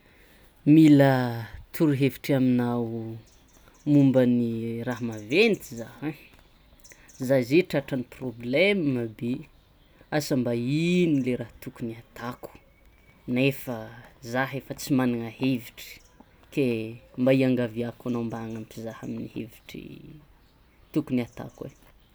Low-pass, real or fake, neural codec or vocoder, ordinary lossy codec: none; real; none; none